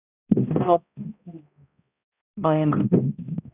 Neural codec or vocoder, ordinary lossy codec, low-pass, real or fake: codec, 16 kHz, 0.5 kbps, X-Codec, HuBERT features, trained on balanced general audio; none; 3.6 kHz; fake